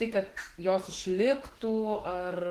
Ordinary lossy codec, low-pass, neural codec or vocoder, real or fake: Opus, 16 kbps; 14.4 kHz; autoencoder, 48 kHz, 32 numbers a frame, DAC-VAE, trained on Japanese speech; fake